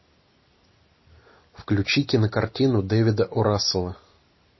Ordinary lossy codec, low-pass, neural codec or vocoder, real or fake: MP3, 24 kbps; 7.2 kHz; none; real